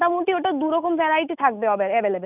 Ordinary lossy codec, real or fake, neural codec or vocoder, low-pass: none; real; none; 3.6 kHz